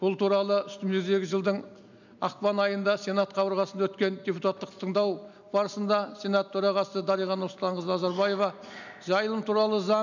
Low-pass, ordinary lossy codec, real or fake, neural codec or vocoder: 7.2 kHz; none; real; none